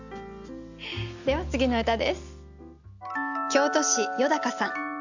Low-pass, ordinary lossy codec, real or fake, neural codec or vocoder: 7.2 kHz; none; real; none